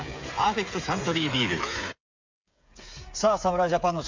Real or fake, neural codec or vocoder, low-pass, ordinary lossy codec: fake; codec, 16 kHz, 8 kbps, FreqCodec, smaller model; 7.2 kHz; MP3, 64 kbps